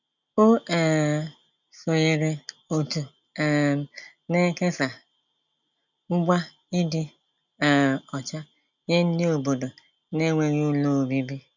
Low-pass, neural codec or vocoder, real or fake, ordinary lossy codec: 7.2 kHz; none; real; none